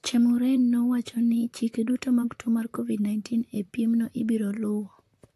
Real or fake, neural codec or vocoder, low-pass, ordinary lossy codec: fake; vocoder, 44.1 kHz, 128 mel bands, Pupu-Vocoder; 14.4 kHz; AAC, 64 kbps